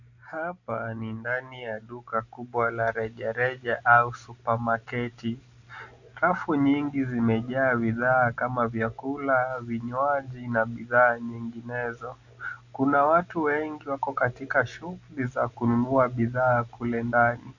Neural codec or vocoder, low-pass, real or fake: none; 7.2 kHz; real